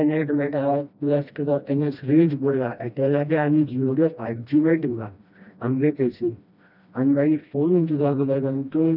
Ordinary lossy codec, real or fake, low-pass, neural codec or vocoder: none; fake; 5.4 kHz; codec, 16 kHz, 1 kbps, FreqCodec, smaller model